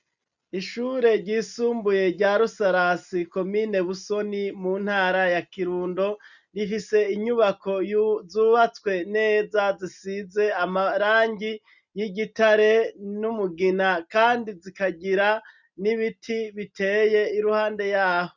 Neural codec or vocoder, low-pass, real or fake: none; 7.2 kHz; real